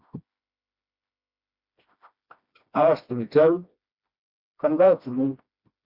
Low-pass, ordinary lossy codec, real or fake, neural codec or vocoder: 5.4 kHz; Opus, 64 kbps; fake; codec, 16 kHz, 1 kbps, FreqCodec, smaller model